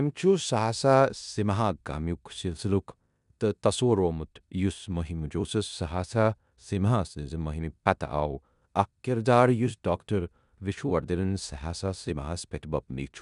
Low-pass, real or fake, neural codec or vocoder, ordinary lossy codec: 10.8 kHz; fake; codec, 16 kHz in and 24 kHz out, 0.9 kbps, LongCat-Audio-Codec, four codebook decoder; none